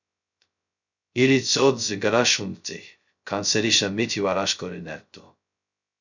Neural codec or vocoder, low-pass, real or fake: codec, 16 kHz, 0.2 kbps, FocalCodec; 7.2 kHz; fake